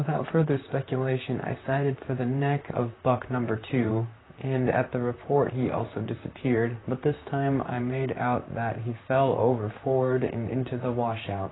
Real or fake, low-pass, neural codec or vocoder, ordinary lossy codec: fake; 7.2 kHz; vocoder, 44.1 kHz, 128 mel bands, Pupu-Vocoder; AAC, 16 kbps